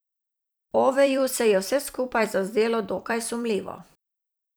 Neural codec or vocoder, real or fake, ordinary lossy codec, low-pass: vocoder, 44.1 kHz, 128 mel bands every 256 samples, BigVGAN v2; fake; none; none